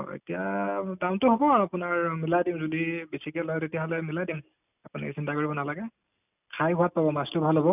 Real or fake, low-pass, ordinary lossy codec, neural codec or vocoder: real; 3.6 kHz; none; none